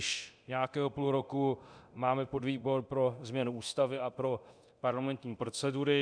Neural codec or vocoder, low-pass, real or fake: codec, 24 kHz, 0.9 kbps, DualCodec; 9.9 kHz; fake